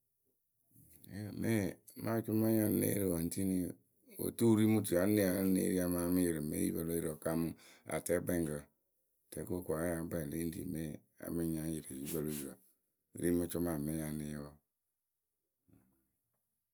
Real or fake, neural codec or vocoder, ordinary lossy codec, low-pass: real; none; none; none